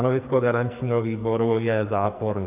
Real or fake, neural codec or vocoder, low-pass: fake; codec, 44.1 kHz, 2.6 kbps, SNAC; 3.6 kHz